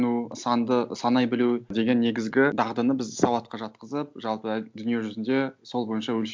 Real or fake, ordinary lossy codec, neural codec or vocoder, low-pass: real; none; none; none